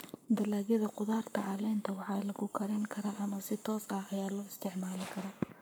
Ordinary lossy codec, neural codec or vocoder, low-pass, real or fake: none; codec, 44.1 kHz, 7.8 kbps, Pupu-Codec; none; fake